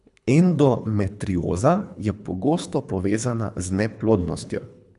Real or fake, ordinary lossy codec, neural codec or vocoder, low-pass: fake; none; codec, 24 kHz, 3 kbps, HILCodec; 10.8 kHz